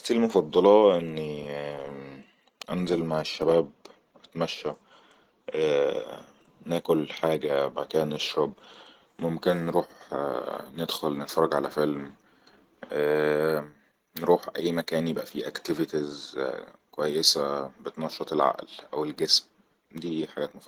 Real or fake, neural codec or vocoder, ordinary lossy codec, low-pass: real; none; Opus, 16 kbps; 19.8 kHz